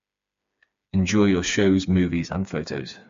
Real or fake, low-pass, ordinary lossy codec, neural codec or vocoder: fake; 7.2 kHz; MP3, 48 kbps; codec, 16 kHz, 4 kbps, FreqCodec, smaller model